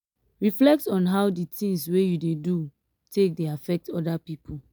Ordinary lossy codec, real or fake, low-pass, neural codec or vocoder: none; real; none; none